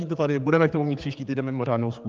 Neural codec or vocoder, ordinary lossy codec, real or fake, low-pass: codec, 16 kHz, 4 kbps, X-Codec, HuBERT features, trained on general audio; Opus, 32 kbps; fake; 7.2 kHz